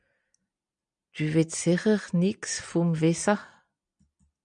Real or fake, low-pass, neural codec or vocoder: real; 9.9 kHz; none